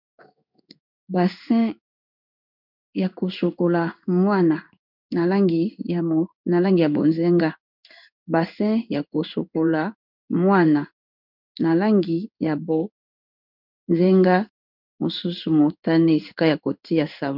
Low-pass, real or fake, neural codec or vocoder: 5.4 kHz; fake; codec, 16 kHz in and 24 kHz out, 1 kbps, XY-Tokenizer